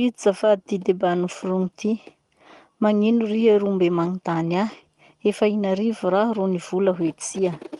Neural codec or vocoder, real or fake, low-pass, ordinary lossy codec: none; real; 10.8 kHz; Opus, 24 kbps